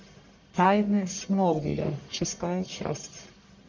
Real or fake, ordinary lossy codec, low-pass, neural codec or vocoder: fake; MP3, 64 kbps; 7.2 kHz; codec, 44.1 kHz, 1.7 kbps, Pupu-Codec